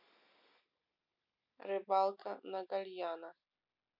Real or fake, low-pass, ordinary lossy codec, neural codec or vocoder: real; 5.4 kHz; none; none